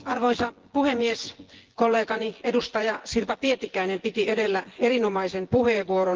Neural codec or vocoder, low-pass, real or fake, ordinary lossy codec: vocoder, 24 kHz, 100 mel bands, Vocos; 7.2 kHz; fake; Opus, 16 kbps